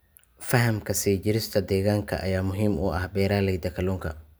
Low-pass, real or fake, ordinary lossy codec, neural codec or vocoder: none; real; none; none